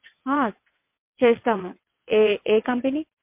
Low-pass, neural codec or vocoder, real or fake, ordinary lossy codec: 3.6 kHz; vocoder, 22.05 kHz, 80 mel bands, WaveNeXt; fake; MP3, 32 kbps